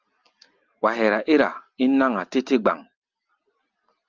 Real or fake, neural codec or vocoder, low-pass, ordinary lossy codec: real; none; 7.2 kHz; Opus, 24 kbps